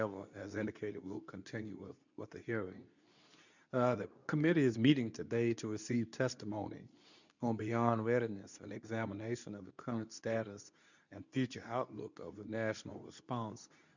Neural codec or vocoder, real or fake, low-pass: codec, 24 kHz, 0.9 kbps, WavTokenizer, medium speech release version 2; fake; 7.2 kHz